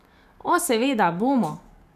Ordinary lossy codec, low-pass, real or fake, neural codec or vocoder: none; 14.4 kHz; fake; codec, 44.1 kHz, 7.8 kbps, DAC